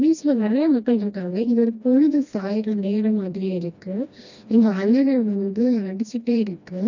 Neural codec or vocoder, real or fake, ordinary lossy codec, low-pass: codec, 16 kHz, 1 kbps, FreqCodec, smaller model; fake; none; 7.2 kHz